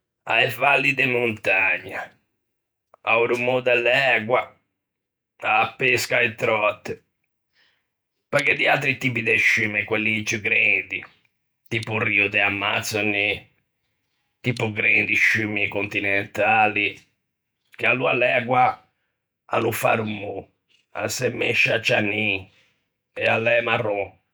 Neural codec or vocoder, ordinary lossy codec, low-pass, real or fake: vocoder, 48 kHz, 128 mel bands, Vocos; none; none; fake